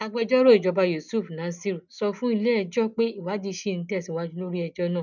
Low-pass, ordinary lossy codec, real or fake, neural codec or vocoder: 7.2 kHz; none; real; none